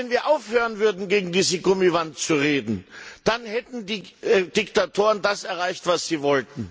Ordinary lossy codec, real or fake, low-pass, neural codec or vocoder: none; real; none; none